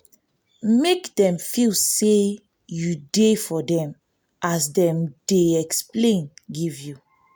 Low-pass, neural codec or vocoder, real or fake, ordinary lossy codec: none; none; real; none